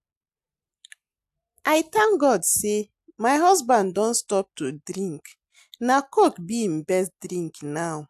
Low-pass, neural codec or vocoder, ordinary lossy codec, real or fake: 14.4 kHz; none; AAC, 96 kbps; real